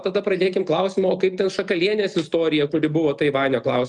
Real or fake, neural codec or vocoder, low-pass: real; none; 10.8 kHz